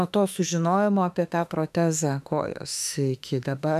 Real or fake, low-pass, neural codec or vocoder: fake; 14.4 kHz; autoencoder, 48 kHz, 32 numbers a frame, DAC-VAE, trained on Japanese speech